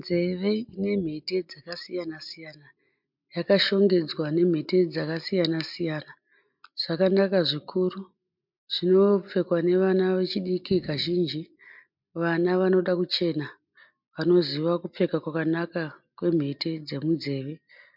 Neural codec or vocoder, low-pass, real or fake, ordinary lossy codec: none; 5.4 kHz; real; MP3, 48 kbps